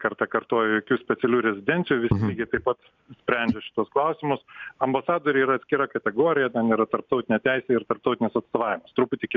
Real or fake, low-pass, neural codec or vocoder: real; 7.2 kHz; none